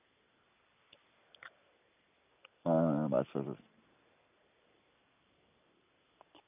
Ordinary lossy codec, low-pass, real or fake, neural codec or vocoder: none; 3.6 kHz; real; none